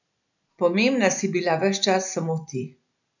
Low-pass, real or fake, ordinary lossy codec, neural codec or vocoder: 7.2 kHz; real; none; none